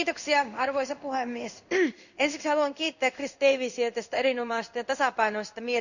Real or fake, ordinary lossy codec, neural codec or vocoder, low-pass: fake; none; codec, 16 kHz in and 24 kHz out, 1 kbps, XY-Tokenizer; 7.2 kHz